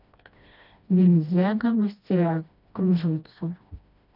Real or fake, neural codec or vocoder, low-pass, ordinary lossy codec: fake; codec, 16 kHz, 1 kbps, FreqCodec, smaller model; 5.4 kHz; none